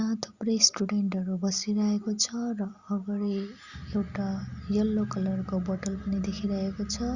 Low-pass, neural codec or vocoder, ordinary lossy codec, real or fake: 7.2 kHz; none; Opus, 64 kbps; real